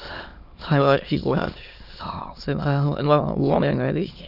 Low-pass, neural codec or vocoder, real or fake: 5.4 kHz; autoencoder, 22.05 kHz, a latent of 192 numbers a frame, VITS, trained on many speakers; fake